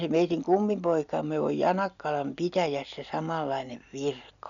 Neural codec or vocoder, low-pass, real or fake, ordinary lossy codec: none; 7.2 kHz; real; none